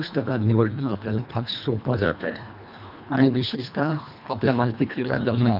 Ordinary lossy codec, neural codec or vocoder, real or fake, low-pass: none; codec, 24 kHz, 1.5 kbps, HILCodec; fake; 5.4 kHz